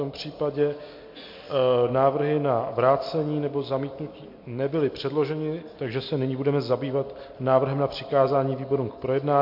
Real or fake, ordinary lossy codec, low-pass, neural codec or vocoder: real; MP3, 32 kbps; 5.4 kHz; none